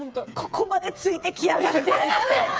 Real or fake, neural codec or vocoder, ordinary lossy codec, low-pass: fake; codec, 16 kHz, 4 kbps, FreqCodec, smaller model; none; none